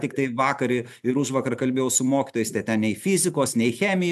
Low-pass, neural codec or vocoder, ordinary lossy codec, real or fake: 14.4 kHz; none; AAC, 96 kbps; real